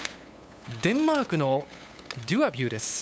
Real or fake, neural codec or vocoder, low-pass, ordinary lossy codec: fake; codec, 16 kHz, 8 kbps, FunCodec, trained on LibriTTS, 25 frames a second; none; none